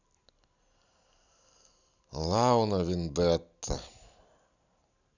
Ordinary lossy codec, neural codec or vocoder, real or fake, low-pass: none; none; real; 7.2 kHz